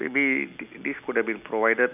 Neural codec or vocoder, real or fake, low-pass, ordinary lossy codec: none; real; 3.6 kHz; none